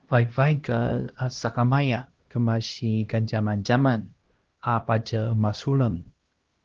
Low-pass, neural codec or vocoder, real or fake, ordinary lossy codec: 7.2 kHz; codec, 16 kHz, 1 kbps, X-Codec, HuBERT features, trained on LibriSpeech; fake; Opus, 16 kbps